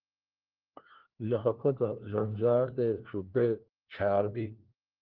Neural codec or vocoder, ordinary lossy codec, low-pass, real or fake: codec, 16 kHz, 1 kbps, FunCodec, trained on LibriTTS, 50 frames a second; Opus, 16 kbps; 5.4 kHz; fake